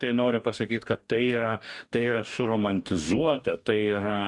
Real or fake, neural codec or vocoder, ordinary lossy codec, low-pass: fake; codec, 44.1 kHz, 2.6 kbps, DAC; Opus, 64 kbps; 10.8 kHz